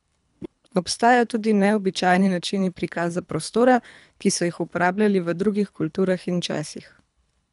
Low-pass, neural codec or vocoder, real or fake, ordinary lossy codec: 10.8 kHz; codec, 24 kHz, 3 kbps, HILCodec; fake; none